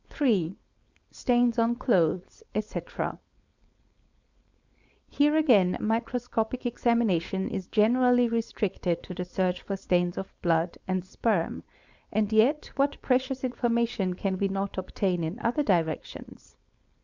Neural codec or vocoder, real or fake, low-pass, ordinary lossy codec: codec, 16 kHz, 4.8 kbps, FACodec; fake; 7.2 kHz; Opus, 64 kbps